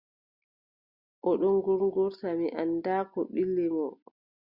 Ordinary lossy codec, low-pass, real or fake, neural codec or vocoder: Opus, 64 kbps; 5.4 kHz; real; none